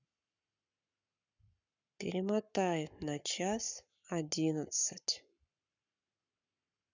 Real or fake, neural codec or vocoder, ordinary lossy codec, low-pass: fake; codec, 44.1 kHz, 7.8 kbps, Pupu-Codec; none; 7.2 kHz